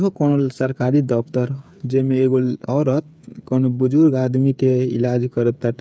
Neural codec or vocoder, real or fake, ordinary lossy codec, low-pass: codec, 16 kHz, 8 kbps, FreqCodec, smaller model; fake; none; none